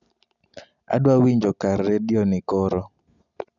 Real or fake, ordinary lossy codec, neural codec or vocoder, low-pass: real; none; none; 7.2 kHz